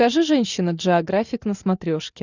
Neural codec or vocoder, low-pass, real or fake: none; 7.2 kHz; real